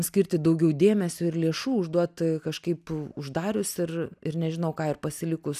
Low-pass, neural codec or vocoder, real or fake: 14.4 kHz; none; real